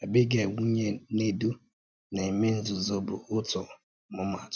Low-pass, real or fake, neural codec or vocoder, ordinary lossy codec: none; real; none; none